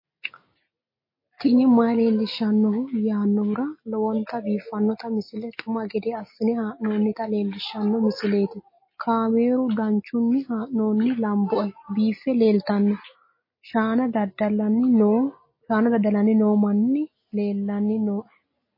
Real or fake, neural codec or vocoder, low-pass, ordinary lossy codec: real; none; 5.4 kHz; MP3, 24 kbps